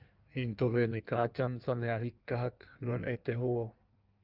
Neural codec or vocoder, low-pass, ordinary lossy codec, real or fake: codec, 16 kHz in and 24 kHz out, 1.1 kbps, FireRedTTS-2 codec; 5.4 kHz; Opus, 24 kbps; fake